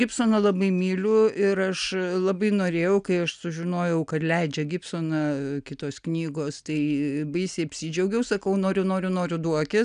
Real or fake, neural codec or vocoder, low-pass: real; none; 9.9 kHz